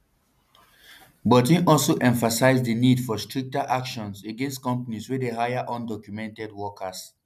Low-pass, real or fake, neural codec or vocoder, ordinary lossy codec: 14.4 kHz; real; none; none